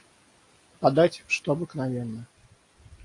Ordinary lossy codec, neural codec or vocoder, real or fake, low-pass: AAC, 48 kbps; none; real; 10.8 kHz